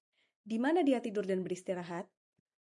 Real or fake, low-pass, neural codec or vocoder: real; 10.8 kHz; none